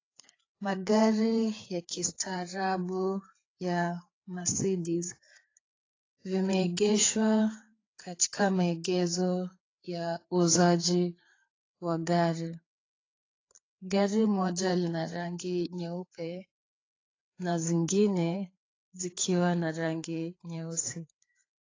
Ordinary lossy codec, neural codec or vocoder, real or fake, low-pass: AAC, 32 kbps; codec, 16 kHz, 4 kbps, FreqCodec, larger model; fake; 7.2 kHz